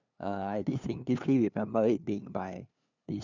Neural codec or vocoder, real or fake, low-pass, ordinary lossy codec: codec, 16 kHz, 2 kbps, FunCodec, trained on LibriTTS, 25 frames a second; fake; 7.2 kHz; none